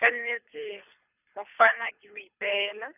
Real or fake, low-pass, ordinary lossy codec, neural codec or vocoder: fake; 3.6 kHz; none; codec, 24 kHz, 3 kbps, HILCodec